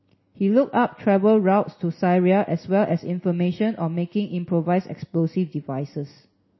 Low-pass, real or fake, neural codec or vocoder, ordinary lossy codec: 7.2 kHz; real; none; MP3, 24 kbps